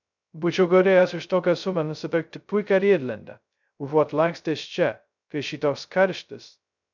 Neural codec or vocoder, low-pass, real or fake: codec, 16 kHz, 0.2 kbps, FocalCodec; 7.2 kHz; fake